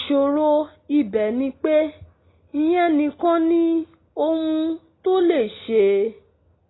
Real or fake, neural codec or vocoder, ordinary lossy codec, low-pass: real; none; AAC, 16 kbps; 7.2 kHz